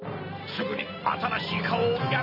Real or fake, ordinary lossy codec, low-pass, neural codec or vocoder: real; none; 5.4 kHz; none